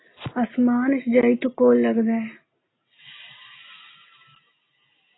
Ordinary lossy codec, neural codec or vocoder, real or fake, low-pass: AAC, 16 kbps; none; real; 7.2 kHz